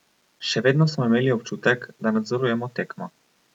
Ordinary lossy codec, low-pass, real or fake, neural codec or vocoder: none; 19.8 kHz; real; none